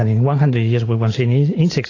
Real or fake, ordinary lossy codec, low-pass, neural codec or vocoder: real; AAC, 32 kbps; 7.2 kHz; none